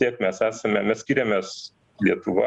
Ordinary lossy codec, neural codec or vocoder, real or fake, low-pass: Opus, 64 kbps; none; real; 10.8 kHz